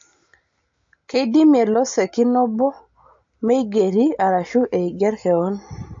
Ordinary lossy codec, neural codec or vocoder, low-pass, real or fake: MP3, 48 kbps; none; 7.2 kHz; real